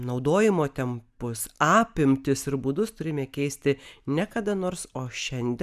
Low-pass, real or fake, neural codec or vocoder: 14.4 kHz; real; none